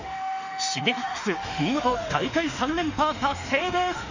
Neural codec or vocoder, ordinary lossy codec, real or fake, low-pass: autoencoder, 48 kHz, 32 numbers a frame, DAC-VAE, trained on Japanese speech; none; fake; 7.2 kHz